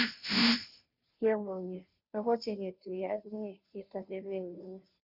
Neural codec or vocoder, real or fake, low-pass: codec, 16 kHz, 0.5 kbps, FunCodec, trained on Chinese and English, 25 frames a second; fake; 5.4 kHz